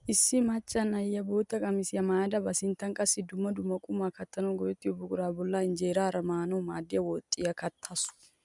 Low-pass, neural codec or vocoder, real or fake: 10.8 kHz; none; real